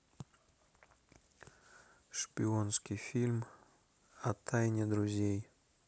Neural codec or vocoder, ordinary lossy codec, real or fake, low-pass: none; none; real; none